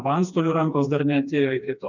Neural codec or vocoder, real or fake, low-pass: codec, 16 kHz, 2 kbps, FreqCodec, smaller model; fake; 7.2 kHz